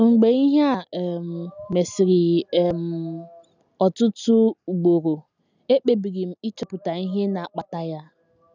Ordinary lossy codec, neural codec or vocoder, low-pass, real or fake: none; none; 7.2 kHz; real